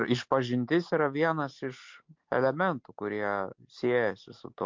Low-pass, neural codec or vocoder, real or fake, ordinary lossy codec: 7.2 kHz; none; real; MP3, 48 kbps